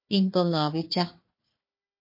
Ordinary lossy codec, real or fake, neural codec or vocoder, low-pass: MP3, 32 kbps; fake; codec, 16 kHz, 1 kbps, FunCodec, trained on Chinese and English, 50 frames a second; 5.4 kHz